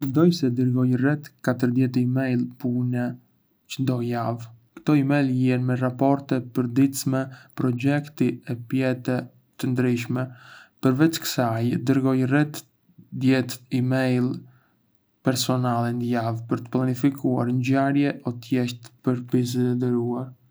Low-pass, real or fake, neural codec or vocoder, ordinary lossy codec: none; real; none; none